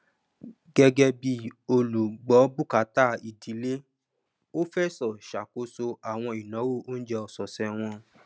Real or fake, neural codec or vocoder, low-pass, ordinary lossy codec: real; none; none; none